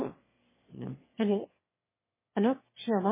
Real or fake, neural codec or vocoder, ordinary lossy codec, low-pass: fake; autoencoder, 22.05 kHz, a latent of 192 numbers a frame, VITS, trained on one speaker; MP3, 16 kbps; 3.6 kHz